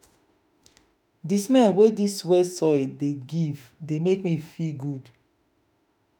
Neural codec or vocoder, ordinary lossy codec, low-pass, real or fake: autoencoder, 48 kHz, 32 numbers a frame, DAC-VAE, trained on Japanese speech; none; none; fake